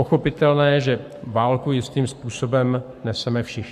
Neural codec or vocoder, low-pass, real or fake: codec, 44.1 kHz, 7.8 kbps, Pupu-Codec; 14.4 kHz; fake